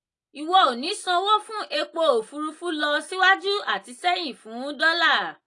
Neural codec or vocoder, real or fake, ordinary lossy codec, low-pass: none; real; AAC, 48 kbps; 10.8 kHz